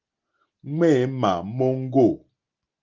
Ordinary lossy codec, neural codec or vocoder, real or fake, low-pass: Opus, 32 kbps; none; real; 7.2 kHz